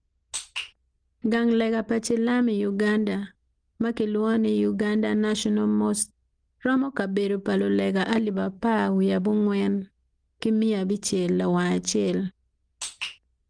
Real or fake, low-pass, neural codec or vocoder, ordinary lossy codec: real; 9.9 kHz; none; Opus, 24 kbps